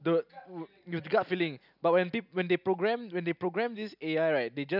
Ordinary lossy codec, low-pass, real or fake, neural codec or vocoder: none; 5.4 kHz; real; none